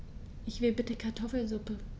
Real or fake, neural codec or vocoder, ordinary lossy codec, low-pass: real; none; none; none